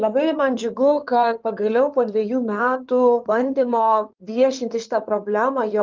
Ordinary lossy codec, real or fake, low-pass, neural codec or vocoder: Opus, 32 kbps; fake; 7.2 kHz; codec, 16 kHz in and 24 kHz out, 2.2 kbps, FireRedTTS-2 codec